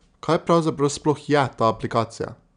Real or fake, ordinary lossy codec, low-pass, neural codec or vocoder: real; none; 9.9 kHz; none